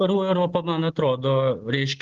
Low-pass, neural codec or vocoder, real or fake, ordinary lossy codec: 7.2 kHz; none; real; Opus, 16 kbps